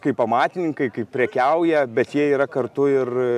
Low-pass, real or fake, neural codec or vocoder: 14.4 kHz; real; none